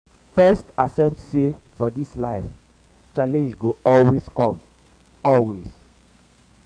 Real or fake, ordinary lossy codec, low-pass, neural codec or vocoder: fake; none; 9.9 kHz; codec, 32 kHz, 1.9 kbps, SNAC